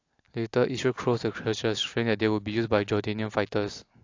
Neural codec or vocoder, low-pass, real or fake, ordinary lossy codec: none; 7.2 kHz; real; AAC, 48 kbps